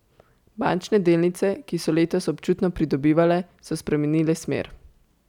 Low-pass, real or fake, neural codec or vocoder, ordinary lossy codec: 19.8 kHz; real; none; none